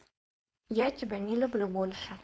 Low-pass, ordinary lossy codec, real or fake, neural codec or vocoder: none; none; fake; codec, 16 kHz, 4.8 kbps, FACodec